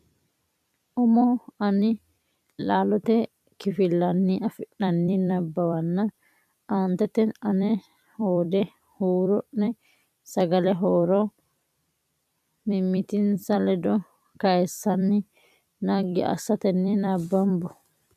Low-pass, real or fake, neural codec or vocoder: 14.4 kHz; fake; vocoder, 44.1 kHz, 128 mel bands every 256 samples, BigVGAN v2